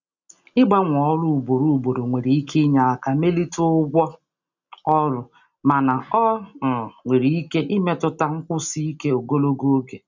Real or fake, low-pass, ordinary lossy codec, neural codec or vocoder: real; 7.2 kHz; none; none